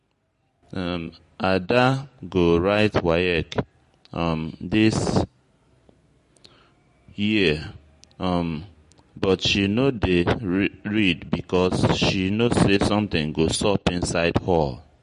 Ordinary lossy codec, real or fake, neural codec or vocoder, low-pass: MP3, 48 kbps; real; none; 14.4 kHz